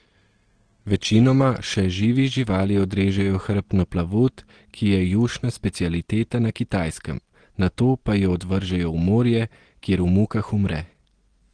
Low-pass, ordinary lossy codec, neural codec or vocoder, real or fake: 9.9 kHz; Opus, 16 kbps; none; real